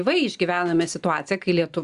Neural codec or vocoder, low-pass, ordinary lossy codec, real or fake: none; 10.8 kHz; Opus, 64 kbps; real